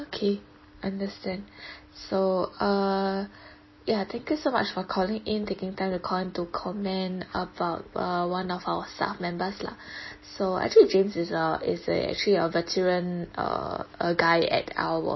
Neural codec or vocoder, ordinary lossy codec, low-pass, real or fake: none; MP3, 24 kbps; 7.2 kHz; real